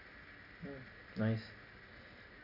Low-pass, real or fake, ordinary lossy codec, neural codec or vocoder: 5.4 kHz; real; none; none